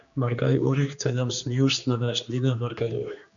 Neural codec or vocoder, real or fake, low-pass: codec, 16 kHz, 2 kbps, X-Codec, HuBERT features, trained on general audio; fake; 7.2 kHz